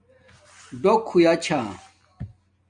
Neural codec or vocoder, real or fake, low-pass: none; real; 9.9 kHz